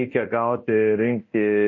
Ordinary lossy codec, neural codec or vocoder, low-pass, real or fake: MP3, 32 kbps; codec, 24 kHz, 0.9 kbps, DualCodec; 7.2 kHz; fake